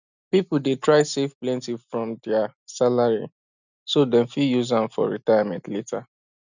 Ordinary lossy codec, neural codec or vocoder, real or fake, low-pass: none; none; real; 7.2 kHz